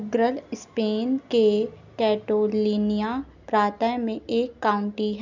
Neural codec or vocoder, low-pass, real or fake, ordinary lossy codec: none; 7.2 kHz; real; none